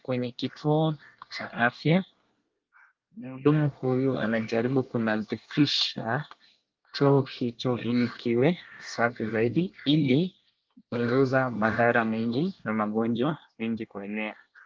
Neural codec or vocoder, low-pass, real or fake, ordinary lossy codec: codec, 24 kHz, 1 kbps, SNAC; 7.2 kHz; fake; Opus, 32 kbps